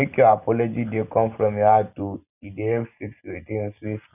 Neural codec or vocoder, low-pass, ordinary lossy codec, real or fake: none; 3.6 kHz; none; real